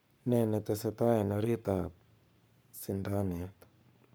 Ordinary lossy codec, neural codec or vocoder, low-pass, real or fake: none; codec, 44.1 kHz, 7.8 kbps, Pupu-Codec; none; fake